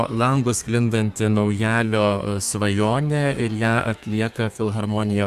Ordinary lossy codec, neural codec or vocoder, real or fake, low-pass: Opus, 64 kbps; codec, 32 kHz, 1.9 kbps, SNAC; fake; 14.4 kHz